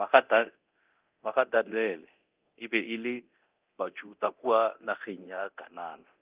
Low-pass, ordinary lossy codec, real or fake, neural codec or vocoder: 3.6 kHz; Opus, 32 kbps; fake; codec, 24 kHz, 0.9 kbps, DualCodec